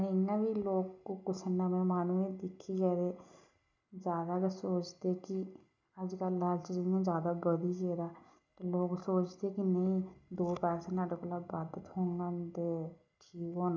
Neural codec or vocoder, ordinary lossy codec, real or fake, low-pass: none; none; real; 7.2 kHz